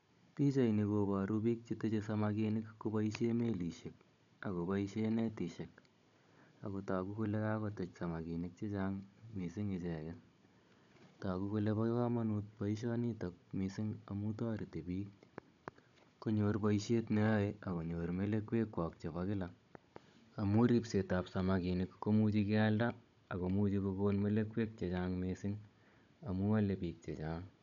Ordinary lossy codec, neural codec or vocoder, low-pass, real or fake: none; codec, 16 kHz, 16 kbps, FunCodec, trained on Chinese and English, 50 frames a second; 7.2 kHz; fake